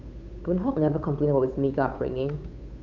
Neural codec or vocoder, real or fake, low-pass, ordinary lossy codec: codec, 16 kHz, 8 kbps, FunCodec, trained on Chinese and English, 25 frames a second; fake; 7.2 kHz; none